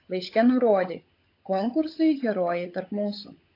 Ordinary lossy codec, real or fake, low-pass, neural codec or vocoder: AAC, 32 kbps; fake; 5.4 kHz; codec, 16 kHz, 16 kbps, FunCodec, trained on LibriTTS, 50 frames a second